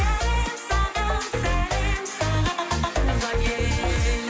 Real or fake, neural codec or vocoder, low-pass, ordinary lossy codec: real; none; none; none